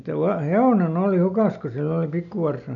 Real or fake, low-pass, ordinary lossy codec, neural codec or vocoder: real; 7.2 kHz; none; none